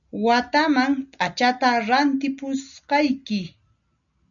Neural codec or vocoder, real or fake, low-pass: none; real; 7.2 kHz